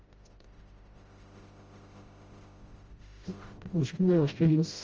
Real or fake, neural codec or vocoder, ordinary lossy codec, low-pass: fake; codec, 16 kHz, 0.5 kbps, FreqCodec, smaller model; Opus, 24 kbps; 7.2 kHz